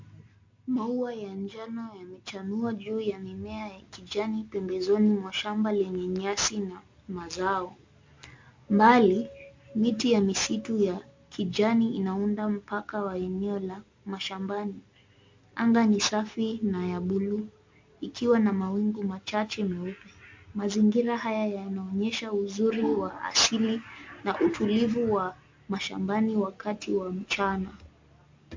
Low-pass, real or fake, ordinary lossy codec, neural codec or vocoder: 7.2 kHz; real; MP3, 48 kbps; none